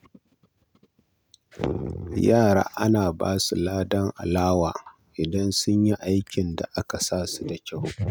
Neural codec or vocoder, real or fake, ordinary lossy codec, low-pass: none; real; none; none